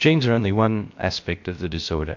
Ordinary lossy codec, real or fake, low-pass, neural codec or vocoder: MP3, 48 kbps; fake; 7.2 kHz; codec, 16 kHz, about 1 kbps, DyCAST, with the encoder's durations